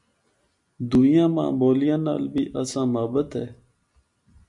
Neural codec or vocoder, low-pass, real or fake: none; 10.8 kHz; real